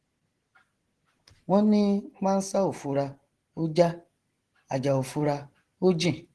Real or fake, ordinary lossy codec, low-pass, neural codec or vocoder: real; Opus, 16 kbps; 10.8 kHz; none